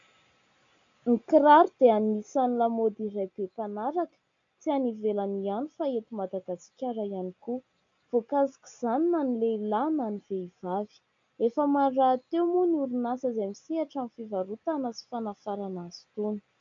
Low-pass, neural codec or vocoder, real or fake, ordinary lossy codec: 7.2 kHz; none; real; MP3, 64 kbps